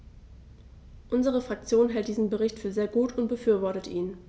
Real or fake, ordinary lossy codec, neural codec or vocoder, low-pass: real; none; none; none